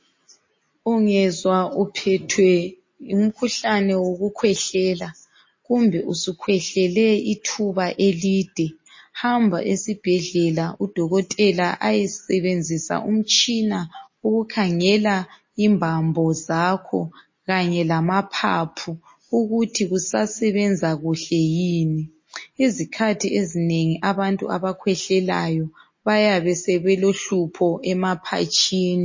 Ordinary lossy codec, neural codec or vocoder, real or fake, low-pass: MP3, 32 kbps; none; real; 7.2 kHz